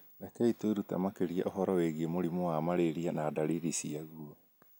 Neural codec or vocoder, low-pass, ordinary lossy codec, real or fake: none; none; none; real